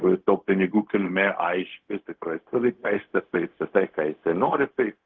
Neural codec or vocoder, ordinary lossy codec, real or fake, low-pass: codec, 16 kHz, 0.4 kbps, LongCat-Audio-Codec; Opus, 16 kbps; fake; 7.2 kHz